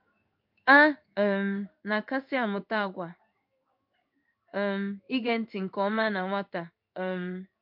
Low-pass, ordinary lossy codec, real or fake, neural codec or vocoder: 5.4 kHz; MP3, 48 kbps; fake; codec, 16 kHz in and 24 kHz out, 1 kbps, XY-Tokenizer